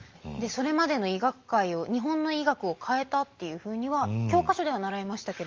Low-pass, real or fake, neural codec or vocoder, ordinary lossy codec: 7.2 kHz; real; none; Opus, 32 kbps